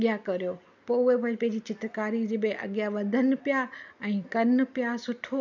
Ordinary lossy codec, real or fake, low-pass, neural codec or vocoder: none; real; 7.2 kHz; none